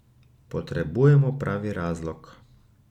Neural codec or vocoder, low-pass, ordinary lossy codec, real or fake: none; 19.8 kHz; none; real